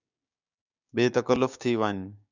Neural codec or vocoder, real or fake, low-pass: codec, 16 kHz, 6 kbps, DAC; fake; 7.2 kHz